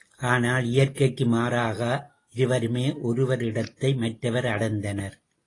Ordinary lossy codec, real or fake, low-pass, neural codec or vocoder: AAC, 32 kbps; real; 10.8 kHz; none